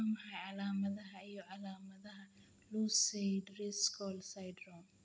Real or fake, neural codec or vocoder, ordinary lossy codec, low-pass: real; none; none; none